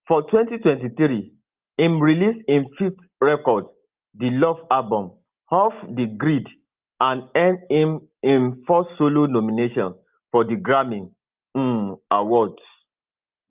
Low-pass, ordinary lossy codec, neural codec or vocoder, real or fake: 3.6 kHz; Opus, 32 kbps; none; real